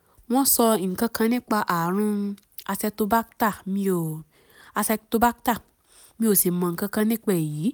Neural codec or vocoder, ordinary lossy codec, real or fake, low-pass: none; none; real; none